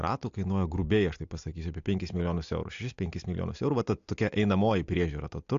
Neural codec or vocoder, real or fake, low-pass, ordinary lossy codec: none; real; 7.2 kHz; AAC, 64 kbps